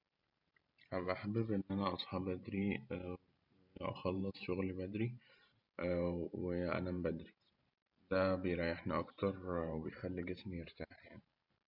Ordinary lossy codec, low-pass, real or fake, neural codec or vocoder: MP3, 48 kbps; 5.4 kHz; fake; vocoder, 44.1 kHz, 128 mel bands every 512 samples, BigVGAN v2